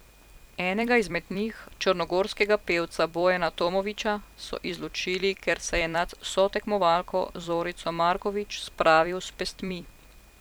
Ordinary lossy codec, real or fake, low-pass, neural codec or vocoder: none; fake; none; vocoder, 44.1 kHz, 128 mel bands every 256 samples, BigVGAN v2